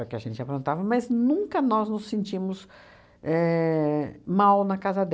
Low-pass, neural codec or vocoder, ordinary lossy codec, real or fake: none; none; none; real